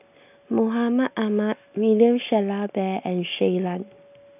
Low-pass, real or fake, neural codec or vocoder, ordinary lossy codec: 3.6 kHz; real; none; none